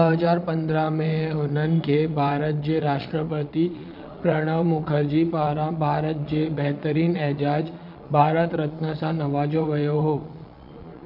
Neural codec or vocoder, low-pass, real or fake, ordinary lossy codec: vocoder, 22.05 kHz, 80 mel bands, Vocos; 5.4 kHz; fake; none